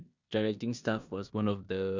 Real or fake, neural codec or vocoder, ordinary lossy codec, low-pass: fake; codec, 16 kHz in and 24 kHz out, 0.9 kbps, LongCat-Audio-Codec, four codebook decoder; Opus, 64 kbps; 7.2 kHz